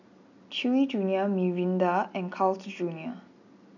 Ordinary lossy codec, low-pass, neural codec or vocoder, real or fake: none; 7.2 kHz; none; real